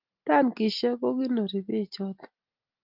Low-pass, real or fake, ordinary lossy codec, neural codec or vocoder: 5.4 kHz; real; Opus, 24 kbps; none